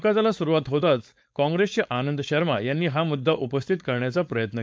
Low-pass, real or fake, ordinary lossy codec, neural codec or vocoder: none; fake; none; codec, 16 kHz, 4.8 kbps, FACodec